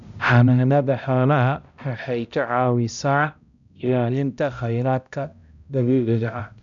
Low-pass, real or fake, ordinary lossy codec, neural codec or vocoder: 7.2 kHz; fake; none; codec, 16 kHz, 0.5 kbps, X-Codec, HuBERT features, trained on balanced general audio